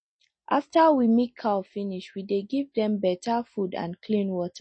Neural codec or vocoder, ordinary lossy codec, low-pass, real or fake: none; MP3, 32 kbps; 9.9 kHz; real